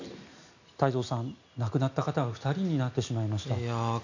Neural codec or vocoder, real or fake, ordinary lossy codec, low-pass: none; real; none; 7.2 kHz